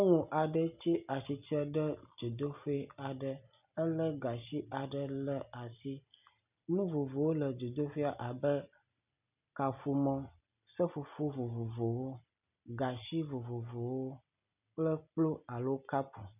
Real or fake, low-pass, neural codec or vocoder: real; 3.6 kHz; none